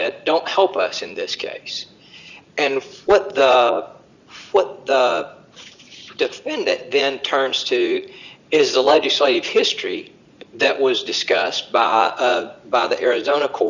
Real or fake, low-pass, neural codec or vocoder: fake; 7.2 kHz; vocoder, 44.1 kHz, 80 mel bands, Vocos